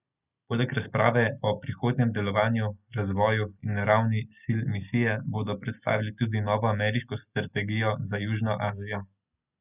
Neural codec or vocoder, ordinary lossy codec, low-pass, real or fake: none; none; 3.6 kHz; real